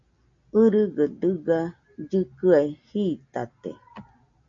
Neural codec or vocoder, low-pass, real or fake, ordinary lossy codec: none; 7.2 kHz; real; MP3, 48 kbps